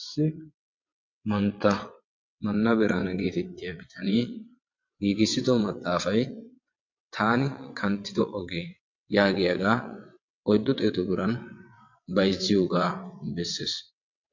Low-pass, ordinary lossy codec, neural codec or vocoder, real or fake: 7.2 kHz; MP3, 48 kbps; vocoder, 22.05 kHz, 80 mel bands, WaveNeXt; fake